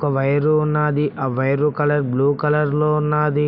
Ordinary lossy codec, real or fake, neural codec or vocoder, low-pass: none; real; none; 5.4 kHz